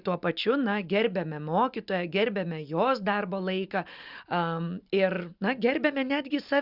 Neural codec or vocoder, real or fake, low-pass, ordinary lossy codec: none; real; 5.4 kHz; Opus, 64 kbps